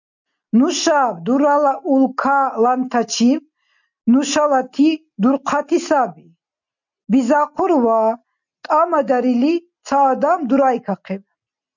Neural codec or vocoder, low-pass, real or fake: none; 7.2 kHz; real